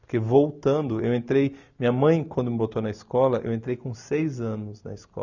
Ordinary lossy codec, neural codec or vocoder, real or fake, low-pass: none; none; real; 7.2 kHz